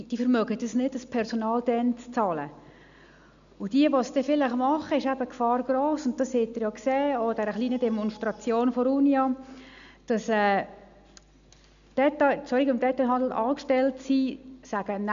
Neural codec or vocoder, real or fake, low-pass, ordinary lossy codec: none; real; 7.2 kHz; none